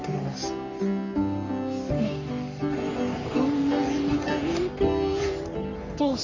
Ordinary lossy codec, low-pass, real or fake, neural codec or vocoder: none; 7.2 kHz; fake; codec, 44.1 kHz, 3.4 kbps, Pupu-Codec